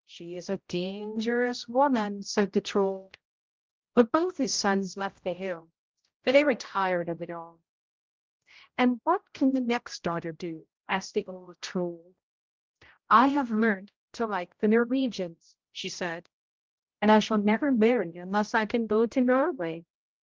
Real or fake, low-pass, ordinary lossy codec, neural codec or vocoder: fake; 7.2 kHz; Opus, 32 kbps; codec, 16 kHz, 0.5 kbps, X-Codec, HuBERT features, trained on general audio